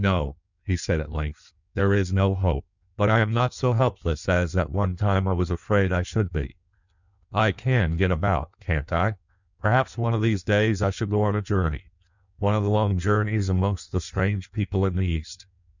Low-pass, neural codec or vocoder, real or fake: 7.2 kHz; codec, 16 kHz in and 24 kHz out, 1.1 kbps, FireRedTTS-2 codec; fake